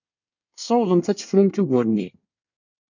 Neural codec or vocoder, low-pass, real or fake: codec, 24 kHz, 1 kbps, SNAC; 7.2 kHz; fake